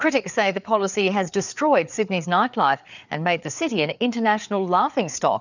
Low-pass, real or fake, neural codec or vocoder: 7.2 kHz; fake; codec, 16 kHz, 4 kbps, FreqCodec, larger model